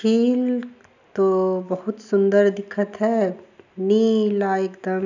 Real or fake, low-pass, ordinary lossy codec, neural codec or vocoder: real; 7.2 kHz; none; none